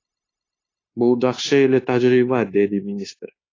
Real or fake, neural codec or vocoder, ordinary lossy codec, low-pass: fake; codec, 16 kHz, 0.9 kbps, LongCat-Audio-Codec; AAC, 32 kbps; 7.2 kHz